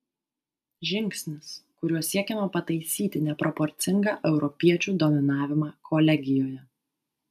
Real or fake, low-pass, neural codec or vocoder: real; 14.4 kHz; none